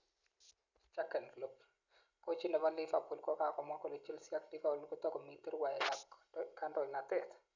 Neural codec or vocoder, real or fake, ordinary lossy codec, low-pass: none; real; none; 7.2 kHz